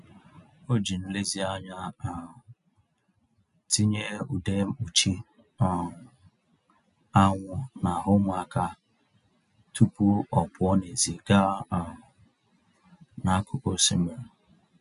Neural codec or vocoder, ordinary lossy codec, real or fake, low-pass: none; none; real; 10.8 kHz